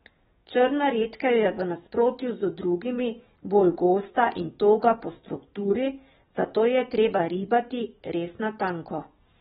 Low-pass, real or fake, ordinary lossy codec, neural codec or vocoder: 19.8 kHz; fake; AAC, 16 kbps; codec, 44.1 kHz, 7.8 kbps, DAC